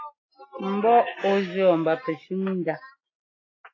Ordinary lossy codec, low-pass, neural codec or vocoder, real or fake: AAC, 32 kbps; 7.2 kHz; none; real